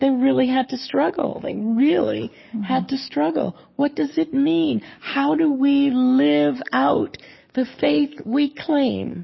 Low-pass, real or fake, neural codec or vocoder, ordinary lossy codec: 7.2 kHz; fake; codec, 44.1 kHz, 7.8 kbps, DAC; MP3, 24 kbps